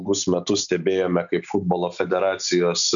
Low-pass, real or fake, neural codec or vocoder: 7.2 kHz; real; none